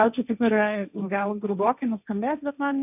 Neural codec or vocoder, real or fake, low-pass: codec, 16 kHz, 1.1 kbps, Voila-Tokenizer; fake; 3.6 kHz